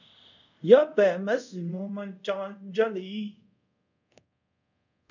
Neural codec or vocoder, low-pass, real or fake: codec, 24 kHz, 0.5 kbps, DualCodec; 7.2 kHz; fake